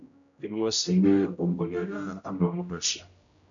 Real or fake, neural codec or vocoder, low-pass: fake; codec, 16 kHz, 0.5 kbps, X-Codec, HuBERT features, trained on general audio; 7.2 kHz